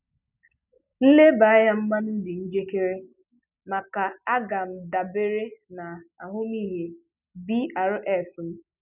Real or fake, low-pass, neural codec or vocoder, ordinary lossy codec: real; 3.6 kHz; none; none